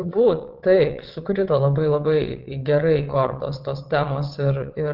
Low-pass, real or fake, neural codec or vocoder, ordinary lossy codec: 5.4 kHz; fake; vocoder, 22.05 kHz, 80 mel bands, WaveNeXt; Opus, 32 kbps